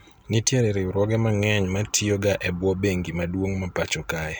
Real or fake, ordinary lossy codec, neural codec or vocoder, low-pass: real; none; none; none